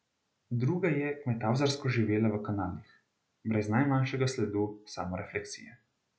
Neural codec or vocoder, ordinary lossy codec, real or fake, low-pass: none; none; real; none